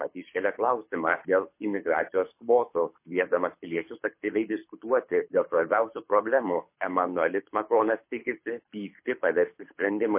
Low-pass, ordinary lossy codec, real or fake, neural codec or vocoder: 3.6 kHz; MP3, 24 kbps; fake; codec, 24 kHz, 6 kbps, HILCodec